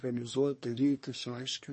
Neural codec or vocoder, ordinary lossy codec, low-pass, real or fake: codec, 24 kHz, 1 kbps, SNAC; MP3, 32 kbps; 10.8 kHz; fake